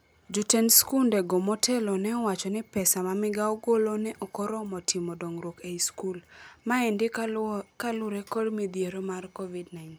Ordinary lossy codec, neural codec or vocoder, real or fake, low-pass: none; none; real; none